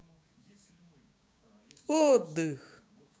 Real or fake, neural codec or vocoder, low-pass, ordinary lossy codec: fake; codec, 16 kHz, 6 kbps, DAC; none; none